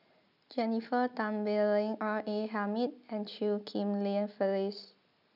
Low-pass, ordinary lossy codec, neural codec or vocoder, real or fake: 5.4 kHz; none; none; real